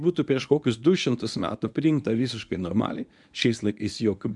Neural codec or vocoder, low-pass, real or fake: codec, 24 kHz, 0.9 kbps, WavTokenizer, medium speech release version 1; 10.8 kHz; fake